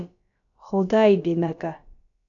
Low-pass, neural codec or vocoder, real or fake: 7.2 kHz; codec, 16 kHz, about 1 kbps, DyCAST, with the encoder's durations; fake